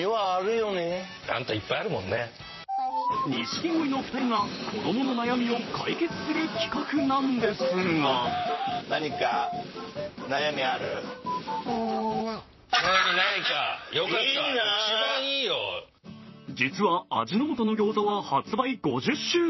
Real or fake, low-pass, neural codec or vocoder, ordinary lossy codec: fake; 7.2 kHz; vocoder, 44.1 kHz, 128 mel bands, Pupu-Vocoder; MP3, 24 kbps